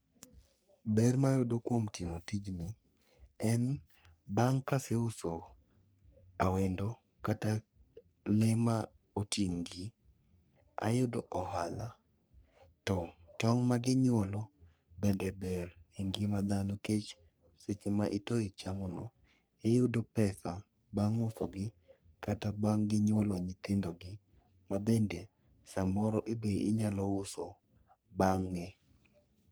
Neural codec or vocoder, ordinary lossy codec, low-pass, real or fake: codec, 44.1 kHz, 3.4 kbps, Pupu-Codec; none; none; fake